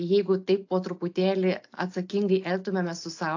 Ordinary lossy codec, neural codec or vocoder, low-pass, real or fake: AAC, 48 kbps; none; 7.2 kHz; real